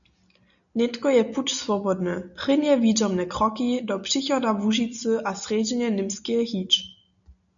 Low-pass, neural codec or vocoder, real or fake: 7.2 kHz; none; real